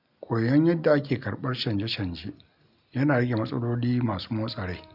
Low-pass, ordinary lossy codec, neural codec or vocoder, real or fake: 5.4 kHz; none; none; real